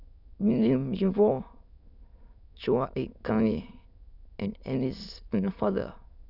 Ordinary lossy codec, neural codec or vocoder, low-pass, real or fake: none; autoencoder, 22.05 kHz, a latent of 192 numbers a frame, VITS, trained on many speakers; 5.4 kHz; fake